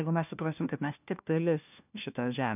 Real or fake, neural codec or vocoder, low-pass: fake; codec, 16 kHz, 1 kbps, FunCodec, trained on LibriTTS, 50 frames a second; 3.6 kHz